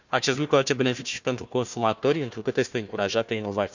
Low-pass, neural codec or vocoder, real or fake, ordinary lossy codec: 7.2 kHz; codec, 16 kHz, 1 kbps, FunCodec, trained on Chinese and English, 50 frames a second; fake; none